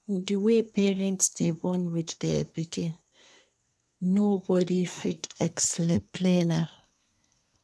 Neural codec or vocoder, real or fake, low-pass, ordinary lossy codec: codec, 24 kHz, 1 kbps, SNAC; fake; none; none